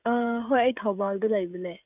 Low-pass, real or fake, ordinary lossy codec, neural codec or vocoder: 3.6 kHz; fake; none; codec, 16 kHz, 16 kbps, FreqCodec, smaller model